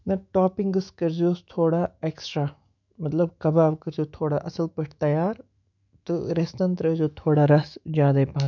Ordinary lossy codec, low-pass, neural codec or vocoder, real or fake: none; 7.2 kHz; autoencoder, 48 kHz, 128 numbers a frame, DAC-VAE, trained on Japanese speech; fake